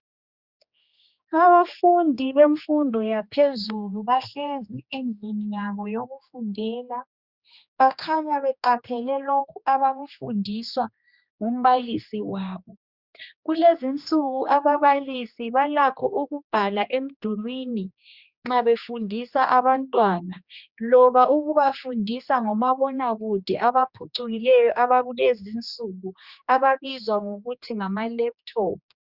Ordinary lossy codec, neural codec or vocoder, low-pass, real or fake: Opus, 64 kbps; codec, 16 kHz, 2 kbps, X-Codec, HuBERT features, trained on general audio; 5.4 kHz; fake